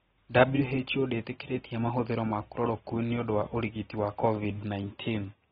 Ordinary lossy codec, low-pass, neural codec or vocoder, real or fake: AAC, 16 kbps; 19.8 kHz; none; real